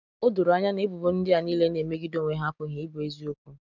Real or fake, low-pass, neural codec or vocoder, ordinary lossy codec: real; 7.2 kHz; none; none